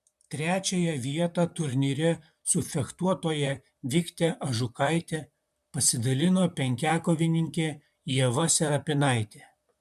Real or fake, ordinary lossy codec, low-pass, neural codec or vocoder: fake; MP3, 96 kbps; 14.4 kHz; vocoder, 44.1 kHz, 128 mel bands, Pupu-Vocoder